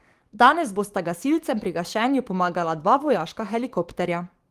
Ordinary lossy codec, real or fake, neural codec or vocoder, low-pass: Opus, 24 kbps; fake; codec, 44.1 kHz, 7.8 kbps, DAC; 14.4 kHz